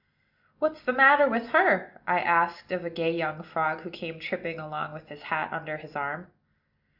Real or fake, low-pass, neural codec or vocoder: real; 5.4 kHz; none